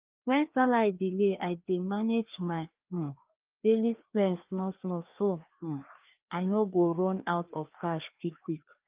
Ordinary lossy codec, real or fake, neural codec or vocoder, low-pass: Opus, 32 kbps; fake; codec, 16 kHz, 2 kbps, FreqCodec, larger model; 3.6 kHz